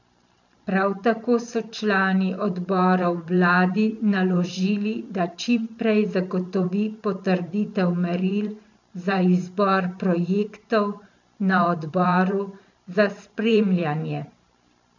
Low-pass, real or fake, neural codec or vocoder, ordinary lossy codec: 7.2 kHz; fake; vocoder, 44.1 kHz, 128 mel bands every 512 samples, BigVGAN v2; none